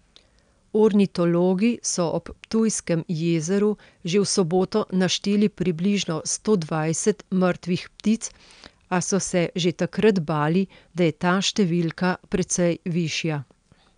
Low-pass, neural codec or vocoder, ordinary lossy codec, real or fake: 9.9 kHz; none; none; real